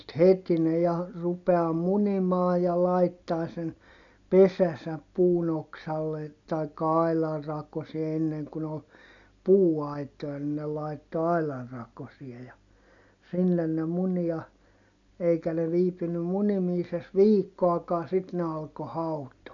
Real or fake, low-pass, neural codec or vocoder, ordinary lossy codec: real; 7.2 kHz; none; none